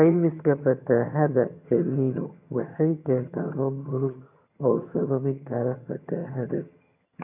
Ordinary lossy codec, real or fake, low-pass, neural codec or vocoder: AAC, 32 kbps; fake; 3.6 kHz; vocoder, 22.05 kHz, 80 mel bands, HiFi-GAN